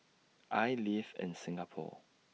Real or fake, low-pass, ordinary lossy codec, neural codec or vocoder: real; none; none; none